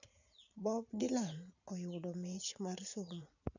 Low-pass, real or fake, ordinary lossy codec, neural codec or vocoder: 7.2 kHz; fake; none; vocoder, 44.1 kHz, 80 mel bands, Vocos